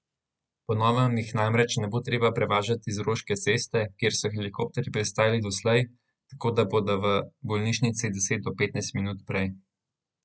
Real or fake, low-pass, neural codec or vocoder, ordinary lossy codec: real; none; none; none